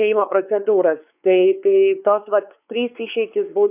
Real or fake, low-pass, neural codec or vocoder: fake; 3.6 kHz; codec, 16 kHz, 4 kbps, X-Codec, WavLM features, trained on Multilingual LibriSpeech